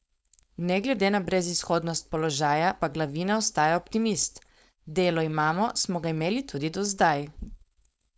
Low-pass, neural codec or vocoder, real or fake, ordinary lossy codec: none; codec, 16 kHz, 4.8 kbps, FACodec; fake; none